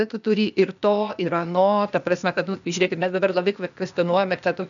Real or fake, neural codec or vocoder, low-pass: fake; codec, 16 kHz, 0.8 kbps, ZipCodec; 7.2 kHz